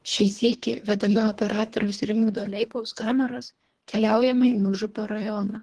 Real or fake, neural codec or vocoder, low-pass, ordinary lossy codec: fake; codec, 24 kHz, 1.5 kbps, HILCodec; 10.8 kHz; Opus, 16 kbps